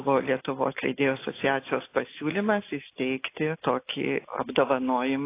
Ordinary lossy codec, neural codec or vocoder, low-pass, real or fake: AAC, 24 kbps; none; 3.6 kHz; real